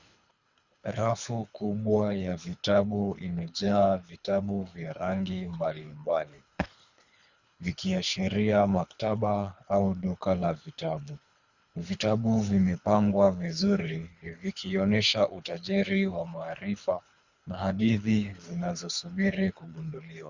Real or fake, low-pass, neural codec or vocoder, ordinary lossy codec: fake; 7.2 kHz; codec, 24 kHz, 3 kbps, HILCodec; Opus, 64 kbps